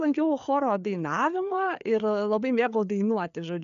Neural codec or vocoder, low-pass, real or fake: codec, 16 kHz, 4 kbps, FreqCodec, larger model; 7.2 kHz; fake